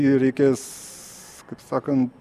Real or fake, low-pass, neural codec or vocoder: real; 14.4 kHz; none